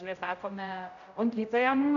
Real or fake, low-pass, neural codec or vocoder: fake; 7.2 kHz; codec, 16 kHz, 0.5 kbps, X-Codec, HuBERT features, trained on general audio